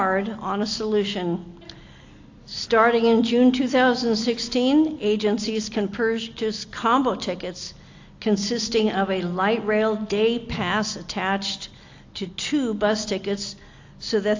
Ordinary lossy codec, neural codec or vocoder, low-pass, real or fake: AAC, 48 kbps; none; 7.2 kHz; real